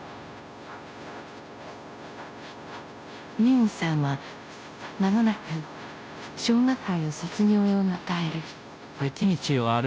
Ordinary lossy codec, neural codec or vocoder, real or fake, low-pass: none; codec, 16 kHz, 0.5 kbps, FunCodec, trained on Chinese and English, 25 frames a second; fake; none